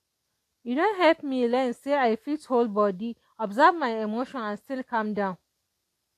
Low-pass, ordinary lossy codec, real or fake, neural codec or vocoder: 14.4 kHz; AAC, 64 kbps; real; none